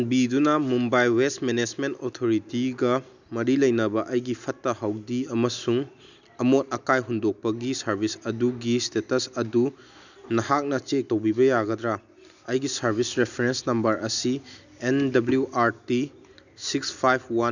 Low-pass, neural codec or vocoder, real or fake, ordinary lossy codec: 7.2 kHz; none; real; none